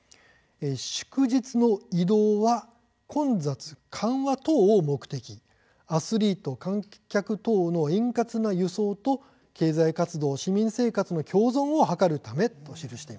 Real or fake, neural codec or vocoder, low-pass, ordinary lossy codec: real; none; none; none